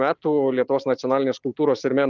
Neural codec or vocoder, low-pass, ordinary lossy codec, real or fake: none; 7.2 kHz; Opus, 24 kbps; real